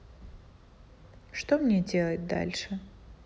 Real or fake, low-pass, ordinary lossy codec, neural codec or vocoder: real; none; none; none